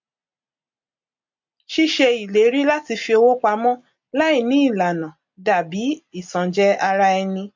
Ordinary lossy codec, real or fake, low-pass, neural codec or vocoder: MP3, 48 kbps; real; 7.2 kHz; none